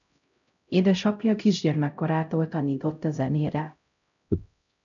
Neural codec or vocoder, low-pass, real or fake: codec, 16 kHz, 0.5 kbps, X-Codec, HuBERT features, trained on LibriSpeech; 7.2 kHz; fake